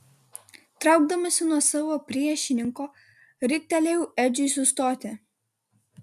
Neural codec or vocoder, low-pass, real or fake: none; 14.4 kHz; real